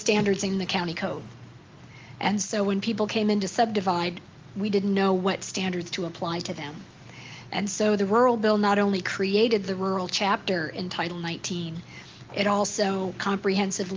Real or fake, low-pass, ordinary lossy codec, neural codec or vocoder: real; 7.2 kHz; Opus, 32 kbps; none